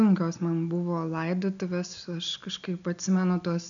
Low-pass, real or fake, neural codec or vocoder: 7.2 kHz; real; none